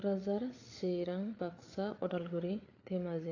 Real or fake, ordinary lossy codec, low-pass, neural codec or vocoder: fake; AAC, 32 kbps; 7.2 kHz; codec, 16 kHz, 8 kbps, FreqCodec, larger model